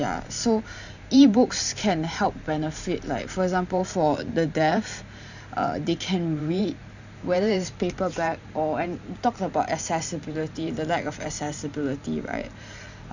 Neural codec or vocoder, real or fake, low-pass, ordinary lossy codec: vocoder, 44.1 kHz, 128 mel bands every 512 samples, BigVGAN v2; fake; 7.2 kHz; none